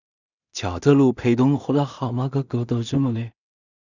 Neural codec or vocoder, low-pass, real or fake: codec, 16 kHz in and 24 kHz out, 0.4 kbps, LongCat-Audio-Codec, two codebook decoder; 7.2 kHz; fake